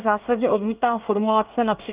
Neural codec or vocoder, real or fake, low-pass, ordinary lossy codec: codec, 24 kHz, 1 kbps, SNAC; fake; 3.6 kHz; Opus, 24 kbps